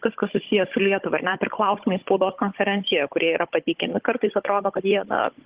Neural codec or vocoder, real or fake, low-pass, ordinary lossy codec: codec, 16 kHz, 16 kbps, FunCodec, trained on Chinese and English, 50 frames a second; fake; 3.6 kHz; Opus, 16 kbps